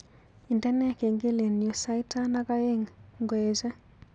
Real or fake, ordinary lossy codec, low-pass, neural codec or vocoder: real; none; 9.9 kHz; none